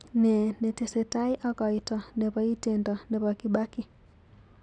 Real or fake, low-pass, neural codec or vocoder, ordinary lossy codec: real; 9.9 kHz; none; none